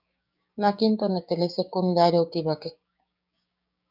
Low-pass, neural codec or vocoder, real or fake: 5.4 kHz; codec, 16 kHz, 6 kbps, DAC; fake